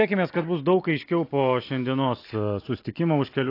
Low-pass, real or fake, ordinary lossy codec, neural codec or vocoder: 5.4 kHz; real; AAC, 32 kbps; none